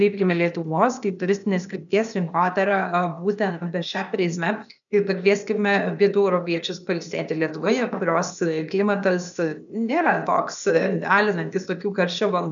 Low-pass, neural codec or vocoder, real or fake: 7.2 kHz; codec, 16 kHz, 0.8 kbps, ZipCodec; fake